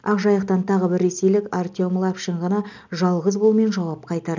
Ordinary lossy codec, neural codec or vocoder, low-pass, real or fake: none; none; 7.2 kHz; real